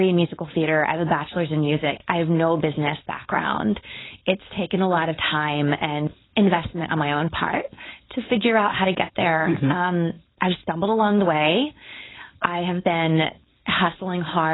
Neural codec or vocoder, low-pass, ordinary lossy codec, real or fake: codec, 16 kHz, 4.8 kbps, FACodec; 7.2 kHz; AAC, 16 kbps; fake